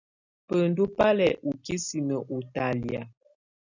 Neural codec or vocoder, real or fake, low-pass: none; real; 7.2 kHz